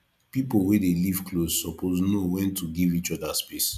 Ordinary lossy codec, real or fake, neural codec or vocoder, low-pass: none; real; none; 14.4 kHz